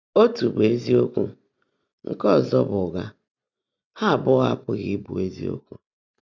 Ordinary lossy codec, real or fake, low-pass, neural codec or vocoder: none; real; 7.2 kHz; none